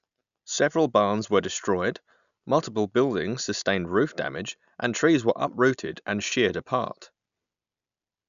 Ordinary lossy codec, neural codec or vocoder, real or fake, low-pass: none; none; real; 7.2 kHz